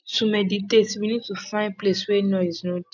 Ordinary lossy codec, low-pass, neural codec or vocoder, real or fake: none; 7.2 kHz; none; real